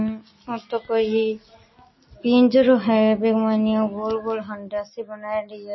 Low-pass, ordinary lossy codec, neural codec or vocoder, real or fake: 7.2 kHz; MP3, 24 kbps; none; real